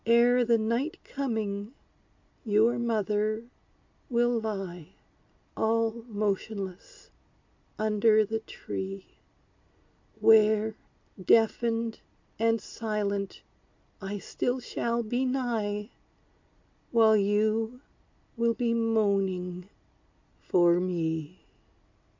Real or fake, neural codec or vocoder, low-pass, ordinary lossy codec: real; none; 7.2 kHz; MP3, 48 kbps